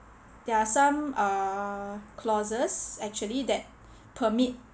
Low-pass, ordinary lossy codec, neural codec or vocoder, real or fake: none; none; none; real